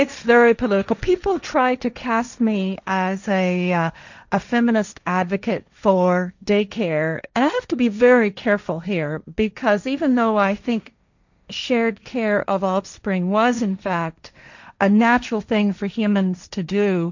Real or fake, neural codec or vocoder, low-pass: fake; codec, 16 kHz, 1.1 kbps, Voila-Tokenizer; 7.2 kHz